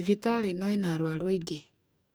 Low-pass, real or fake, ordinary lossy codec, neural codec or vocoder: none; fake; none; codec, 44.1 kHz, 2.6 kbps, DAC